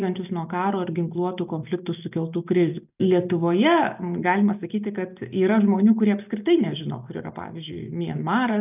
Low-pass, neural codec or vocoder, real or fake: 3.6 kHz; none; real